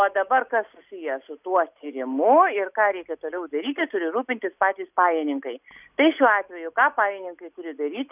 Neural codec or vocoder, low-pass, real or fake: none; 3.6 kHz; real